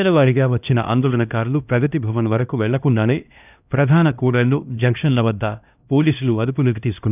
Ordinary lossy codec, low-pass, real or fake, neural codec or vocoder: none; 3.6 kHz; fake; codec, 16 kHz, 1 kbps, X-Codec, HuBERT features, trained on LibriSpeech